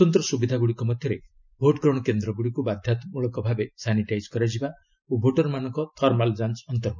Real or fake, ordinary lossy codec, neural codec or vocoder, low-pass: real; none; none; 7.2 kHz